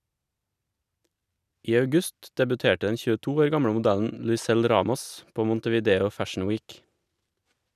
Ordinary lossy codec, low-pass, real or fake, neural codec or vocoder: none; 14.4 kHz; real; none